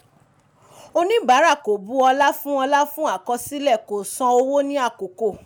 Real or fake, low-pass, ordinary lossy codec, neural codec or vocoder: real; none; none; none